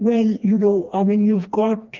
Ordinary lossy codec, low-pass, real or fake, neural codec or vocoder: Opus, 24 kbps; 7.2 kHz; fake; codec, 16 kHz, 2 kbps, FreqCodec, smaller model